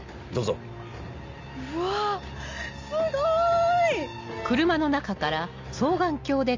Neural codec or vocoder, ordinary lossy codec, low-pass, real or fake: none; MP3, 64 kbps; 7.2 kHz; real